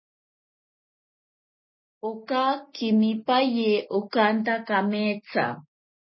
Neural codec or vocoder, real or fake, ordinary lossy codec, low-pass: none; real; MP3, 24 kbps; 7.2 kHz